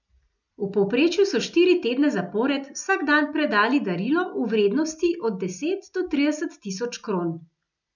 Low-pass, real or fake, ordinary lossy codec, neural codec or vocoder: 7.2 kHz; real; none; none